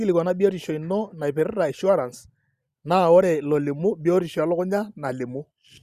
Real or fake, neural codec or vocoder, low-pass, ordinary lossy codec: real; none; 14.4 kHz; Opus, 64 kbps